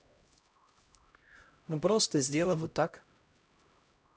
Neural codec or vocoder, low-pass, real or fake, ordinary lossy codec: codec, 16 kHz, 0.5 kbps, X-Codec, HuBERT features, trained on LibriSpeech; none; fake; none